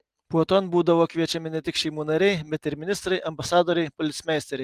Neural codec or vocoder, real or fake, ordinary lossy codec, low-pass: none; real; Opus, 24 kbps; 14.4 kHz